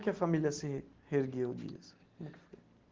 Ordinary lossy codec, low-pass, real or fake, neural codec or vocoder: Opus, 16 kbps; 7.2 kHz; real; none